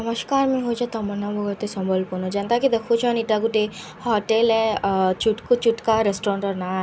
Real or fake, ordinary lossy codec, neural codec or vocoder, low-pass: real; none; none; none